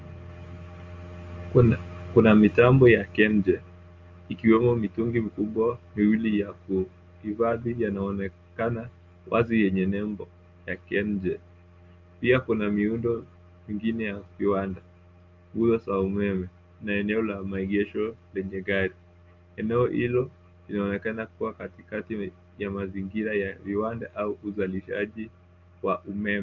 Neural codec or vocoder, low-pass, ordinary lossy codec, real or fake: none; 7.2 kHz; Opus, 32 kbps; real